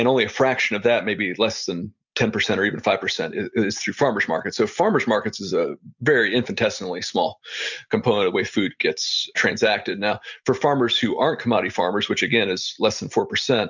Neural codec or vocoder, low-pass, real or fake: none; 7.2 kHz; real